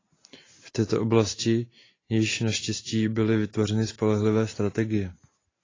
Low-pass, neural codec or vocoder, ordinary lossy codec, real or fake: 7.2 kHz; none; AAC, 32 kbps; real